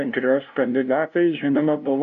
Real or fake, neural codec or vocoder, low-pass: fake; codec, 16 kHz, 0.5 kbps, FunCodec, trained on LibriTTS, 25 frames a second; 7.2 kHz